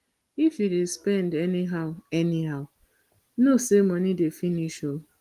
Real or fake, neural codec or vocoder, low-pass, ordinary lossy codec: real; none; 14.4 kHz; Opus, 24 kbps